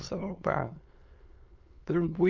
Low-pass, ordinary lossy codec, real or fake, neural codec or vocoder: 7.2 kHz; Opus, 24 kbps; fake; autoencoder, 22.05 kHz, a latent of 192 numbers a frame, VITS, trained on many speakers